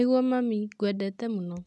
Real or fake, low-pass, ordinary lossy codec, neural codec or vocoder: real; none; none; none